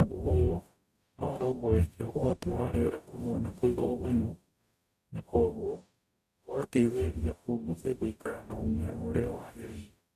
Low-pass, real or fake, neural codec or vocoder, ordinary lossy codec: 14.4 kHz; fake; codec, 44.1 kHz, 0.9 kbps, DAC; none